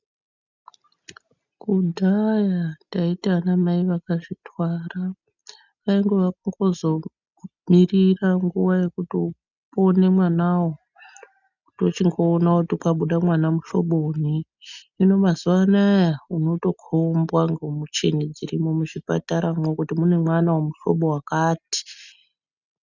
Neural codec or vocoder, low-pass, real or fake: none; 7.2 kHz; real